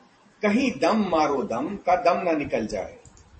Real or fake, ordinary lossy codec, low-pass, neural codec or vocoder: fake; MP3, 32 kbps; 10.8 kHz; vocoder, 44.1 kHz, 128 mel bands every 512 samples, BigVGAN v2